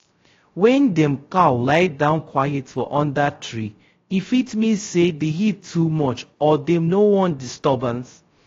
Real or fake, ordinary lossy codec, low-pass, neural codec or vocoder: fake; AAC, 32 kbps; 7.2 kHz; codec, 16 kHz, 0.3 kbps, FocalCodec